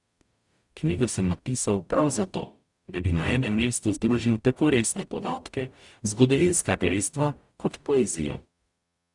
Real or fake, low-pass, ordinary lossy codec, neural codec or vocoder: fake; 10.8 kHz; Opus, 64 kbps; codec, 44.1 kHz, 0.9 kbps, DAC